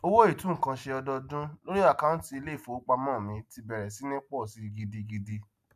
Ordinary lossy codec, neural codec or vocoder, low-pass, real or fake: MP3, 96 kbps; none; 14.4 kHz; real